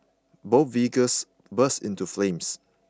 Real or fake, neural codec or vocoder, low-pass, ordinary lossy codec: real; none; none; none